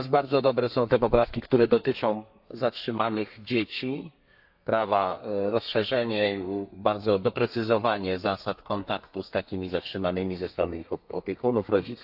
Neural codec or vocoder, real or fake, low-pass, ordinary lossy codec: codec, 32 kHz, 1.9 kbps, SNAC; fake; 5.4 kHz; AAC, 48 kbps